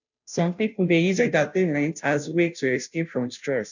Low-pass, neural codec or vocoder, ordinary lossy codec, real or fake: 7.2 kHz; codec, 16 kHz, 0.5 kbps, FunCodec, trained on Chinese and English, 25 frames a second; none; fake